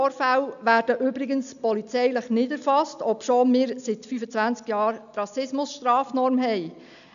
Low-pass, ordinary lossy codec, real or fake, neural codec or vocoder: 7.2 kHz; none; real; none